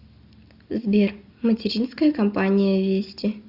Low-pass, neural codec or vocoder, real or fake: 5.4 kHz; none; real